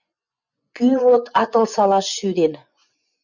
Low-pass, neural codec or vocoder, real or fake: 7.2 kHz; vocoder, 24 kHz, 100 mel bands, Vocos; fake